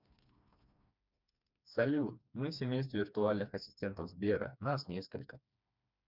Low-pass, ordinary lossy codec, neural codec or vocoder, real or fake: 5.4 kHz; none; codec, 16 kHz, 2 kbps, FreqCodec, smaller model; fake